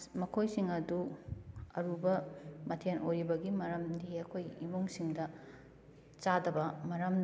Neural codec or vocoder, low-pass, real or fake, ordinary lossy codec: none; none; real; none